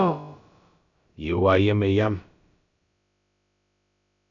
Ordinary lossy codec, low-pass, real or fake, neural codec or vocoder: MP3, 96 kbps; 7.2 kHz; fake; codec, 16 kHz, about 1 kbps, DyCAST, with the encoder's durations